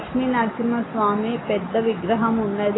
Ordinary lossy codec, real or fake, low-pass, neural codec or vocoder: AAC, 16 kbps; real; 7.2 kHz; none